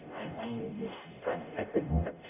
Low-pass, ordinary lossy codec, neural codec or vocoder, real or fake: 3.6 kHz; none; codec, 44.1 kHz, 0.9 kbps, DAC; fake